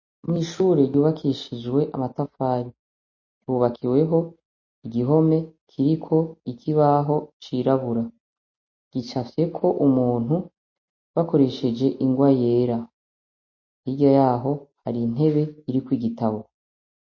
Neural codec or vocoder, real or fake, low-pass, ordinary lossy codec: none; real; 7.2 kHz; MP3, 32 kbps